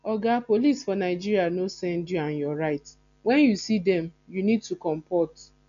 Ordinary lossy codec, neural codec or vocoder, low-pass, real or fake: MP3, 96 kbps; none; 7.2 kHz; real